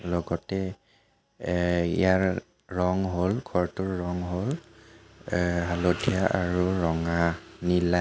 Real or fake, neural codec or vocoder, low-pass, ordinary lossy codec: real; none; none; none